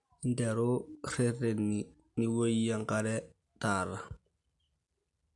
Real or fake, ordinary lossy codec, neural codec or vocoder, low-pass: real; AAC, 64 kbps; none; 10.8 kHz